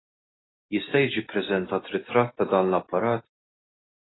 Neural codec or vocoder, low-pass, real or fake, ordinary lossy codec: none; 7.2 kHz; real; AAC, 16 kbps